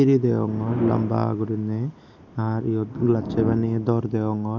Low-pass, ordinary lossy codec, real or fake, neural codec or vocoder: 7.2 kHz; none; real; none